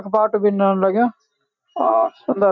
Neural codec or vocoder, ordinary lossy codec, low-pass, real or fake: none; none; 7.2 kHz; real